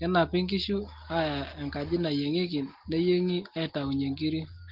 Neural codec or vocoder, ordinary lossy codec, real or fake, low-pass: none; Opus, 16 kbps; real; 5.4 kHz